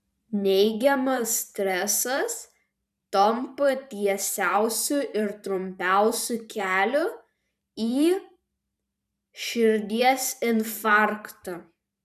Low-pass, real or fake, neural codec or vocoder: 14.4 kHz; fake; vocoder, 44.1 kHz, 128 mel bands every 512 samples, BigVGAN v2